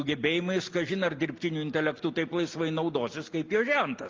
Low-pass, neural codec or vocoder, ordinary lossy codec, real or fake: 7.2 kHz; none; Opus, 24 kbps; real